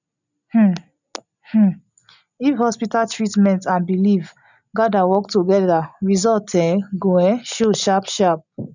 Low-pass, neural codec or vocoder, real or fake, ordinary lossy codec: 7.2 kHz; none; real; none